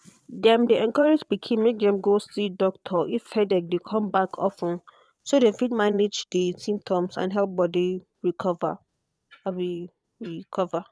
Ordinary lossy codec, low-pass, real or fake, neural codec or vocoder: none; none; fake; vocoder, 22.05 kHz, 80 mel bands, Vocos